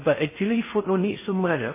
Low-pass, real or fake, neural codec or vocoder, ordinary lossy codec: 3.6 kHz; fake; codec, 16 kHz in and 24 kHz out, 0.6 kbps, FocalCodec, streaming, 4096 codes; MP3, 16 kbps